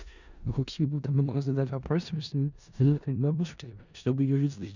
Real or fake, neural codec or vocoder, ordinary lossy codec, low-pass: fake; codec, 16 kHz in and 24 kHz out, 0.4 kbps, LongCat-Audio-Codec, four codebook decoder; none; 7.2 kHz